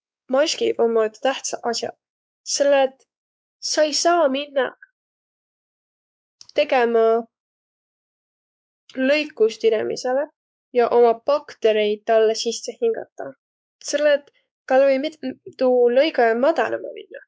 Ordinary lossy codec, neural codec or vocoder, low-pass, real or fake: none; codec, 16 kHz, 4 kbps, X-Codec, WavLM features, trained on Multilingual LibriSpeech; none; fake